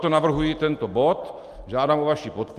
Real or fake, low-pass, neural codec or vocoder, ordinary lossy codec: real; 14.4 kHz; none; Opus, 32 kbps